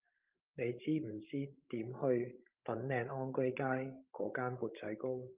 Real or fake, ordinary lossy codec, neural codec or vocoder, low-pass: fake; Opus, 24 kbps; codec, 16 kHz, 16 kbps, FreqCodec, larger model; 3.6 kHz